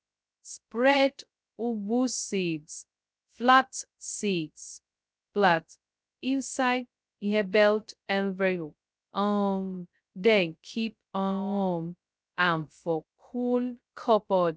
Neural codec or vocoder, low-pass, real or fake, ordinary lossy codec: codec, 16 kHz, 0.2 kbps, FocalCodec; none; fake; none